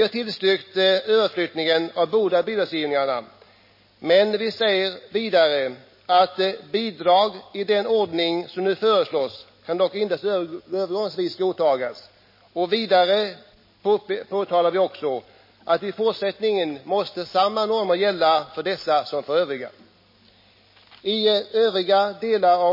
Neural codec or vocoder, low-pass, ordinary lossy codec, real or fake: none; 5.4 kHz; MP3, 24 kbps; real